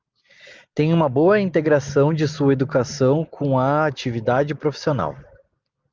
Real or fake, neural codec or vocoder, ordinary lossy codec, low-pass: real; none; Opus, 32 kbps; 7.2 kHz